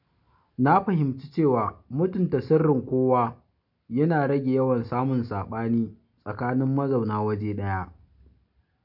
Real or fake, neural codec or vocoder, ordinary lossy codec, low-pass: real; none; none; 5.4 kHz